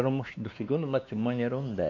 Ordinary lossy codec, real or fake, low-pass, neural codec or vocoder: none; fake; 7.2 kHz; codec, 16 kHz, 2 kbps, X-Codec, WavLM features, trained on Multilingual LibriSpeech